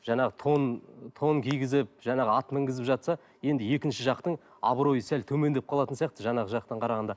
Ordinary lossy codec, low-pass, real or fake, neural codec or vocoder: none; none; real; none